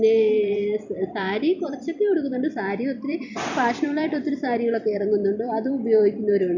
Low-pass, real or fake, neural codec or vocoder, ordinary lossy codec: 7.2 kHz; fake; vocoder, 44.1 kHz, 128 mel bands every 256 samples, BigVGAN v2; none